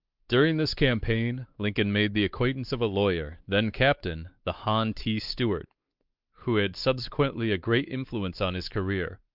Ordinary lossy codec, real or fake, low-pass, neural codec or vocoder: Opus, 32 kbps; fake; 5.4 kHz; codec, 16 kHz, 4 kbps, X-Codec, WavLM features, trained on Multilingual LibriSpeech